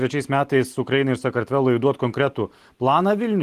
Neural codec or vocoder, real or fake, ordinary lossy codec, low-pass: none; real; Opus, 24 kbps; 14.4 kHz